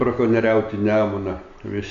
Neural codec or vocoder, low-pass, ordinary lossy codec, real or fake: none; 7.2 kHz; AAC, 96 kbps; real